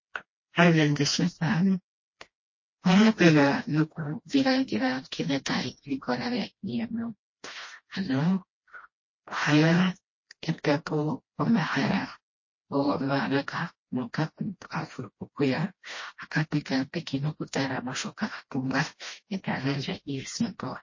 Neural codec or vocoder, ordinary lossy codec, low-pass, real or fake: codec, 16 kHz, 1 kbps, FreqCodec, smaller model; MP3, 32 kbps; 7.2 kHz; fake